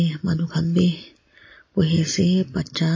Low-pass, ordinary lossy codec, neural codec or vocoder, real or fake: 7.2 kHz; MP3, 32 kbps; none; real